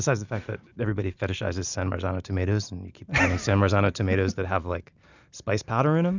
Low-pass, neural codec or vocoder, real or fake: 7.2 kHz; none; real